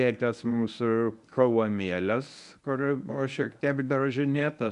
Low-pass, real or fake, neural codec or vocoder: 10.8 kHz; fake; codec, 24 kHz, 0.9 kbps, WavTokenizer, medium speech release version 1